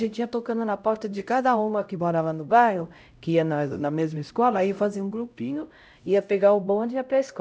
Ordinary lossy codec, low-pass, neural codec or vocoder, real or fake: none; none; codec, 16 kHz, 0.5 kbps, X-Codec, HuBERT features, trained on LibriSpeech; fake